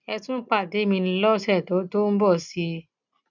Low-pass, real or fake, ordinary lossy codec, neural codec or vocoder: 7.2 kHz; real; none; none